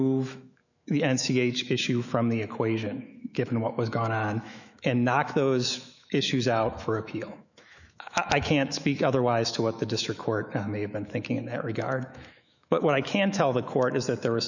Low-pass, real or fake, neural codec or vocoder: 7.2 kHz; fake; autoencoder, 48 kHz, 128 numbers a frame, DAC-VAE, trained on Japanese speech